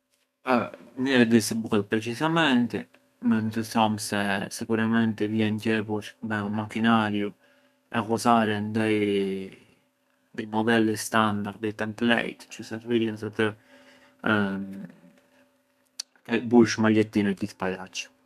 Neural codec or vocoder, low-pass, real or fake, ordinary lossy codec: codec, 32 kHz, 1.9 kbps, SNAC; 14.4 kHz; fake; none